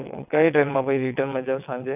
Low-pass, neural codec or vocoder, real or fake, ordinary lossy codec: 3.6 kHz; vocoder, 22.05 kHz, 80 mel bands, WaveNeXt; fake; none